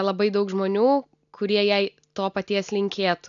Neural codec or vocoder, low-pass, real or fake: none; 7.2 kHz; real